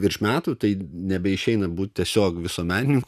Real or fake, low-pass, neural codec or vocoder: real; 14.4 kHz; none